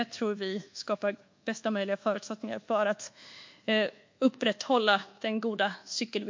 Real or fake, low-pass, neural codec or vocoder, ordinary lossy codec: fake; 7.2 kHz; codec, 24 kHz, 1.2 kbps, DualCodec; MP3, 48 kbps